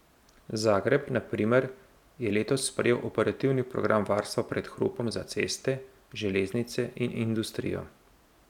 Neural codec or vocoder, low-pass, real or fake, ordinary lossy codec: none; 19.8 kHz; real; none